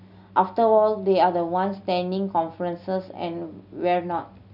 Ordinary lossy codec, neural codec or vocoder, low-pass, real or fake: none; none; 5.4 kHz; real